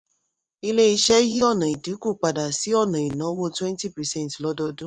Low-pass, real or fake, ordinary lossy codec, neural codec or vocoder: 9.9 kHz; fake; none; vocoder, 24 kHz, 100 mel bands, Vocos